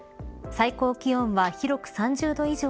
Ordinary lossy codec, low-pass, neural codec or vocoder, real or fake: none; none; none; real